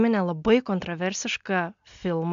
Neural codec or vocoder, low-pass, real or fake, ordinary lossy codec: none; 7.2 kHz; real; MP3, 64 kbps